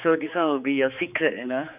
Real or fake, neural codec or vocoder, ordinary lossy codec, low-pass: fake; codec, 16 kHz, 4 kbps, X-Codec, HuBERT features, trained on general audio; none; 3.6 kHz